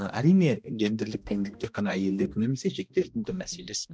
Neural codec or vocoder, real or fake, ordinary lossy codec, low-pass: codec, 16 kHz, 1 kbps, X-Codec, HuBERT features, trained on balanced general audio; fake; none; none